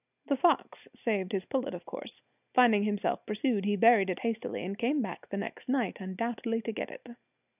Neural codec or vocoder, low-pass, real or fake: none; 3.6 kHz; real